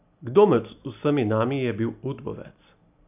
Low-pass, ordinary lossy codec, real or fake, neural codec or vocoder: 3.6 kHz; none; real; none